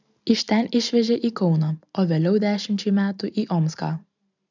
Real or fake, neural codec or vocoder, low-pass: real; none; 7.2 kHz